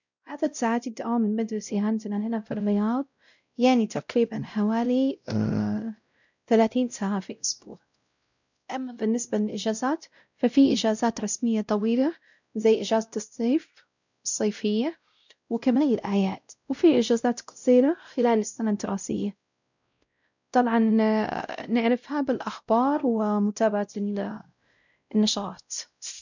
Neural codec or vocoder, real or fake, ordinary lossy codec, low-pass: codec, 16 kHz, 0.5 kbps, X-Codec, WavLM features, trained on Multilingual LibriSpeech; fake; none; 7.2 kHz